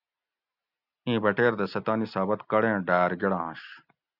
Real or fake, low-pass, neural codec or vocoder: real; 5.4 kHz; none